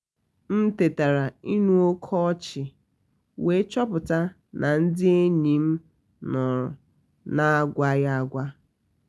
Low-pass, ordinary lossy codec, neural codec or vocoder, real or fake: none; none; none; real